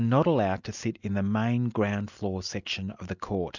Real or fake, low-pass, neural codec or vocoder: real; 7.2 kHz; none